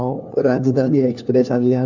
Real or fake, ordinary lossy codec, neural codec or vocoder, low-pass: fake; none; codec, 16 kHz, 1 kbps, FunCodec, trained on LibriTTS, 50 frames a second; 7.2 kHz